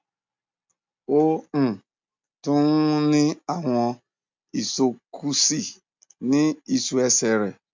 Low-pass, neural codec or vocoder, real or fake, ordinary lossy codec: 7.2 kHz; none; real; MP3, 64 kbps